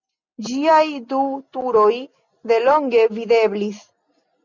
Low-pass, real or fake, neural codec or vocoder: 7.2 kHz; real; none